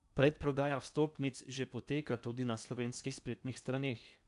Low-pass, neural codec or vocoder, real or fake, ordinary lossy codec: 10.8 kHz; codec, 16 kHz in and 24 kHz out, 0.8 kbps, FocalCodec, streaming, 65536 codes; fake; none